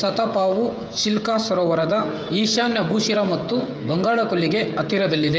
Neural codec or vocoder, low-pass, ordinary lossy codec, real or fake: codec, 16 kHz, 16 kbps, FreqCodec, smaller model; none; none; fake